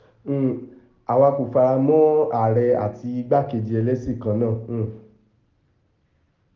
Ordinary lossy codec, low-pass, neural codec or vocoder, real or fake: Opus, 24 kbps; 7.2 kHz; none; real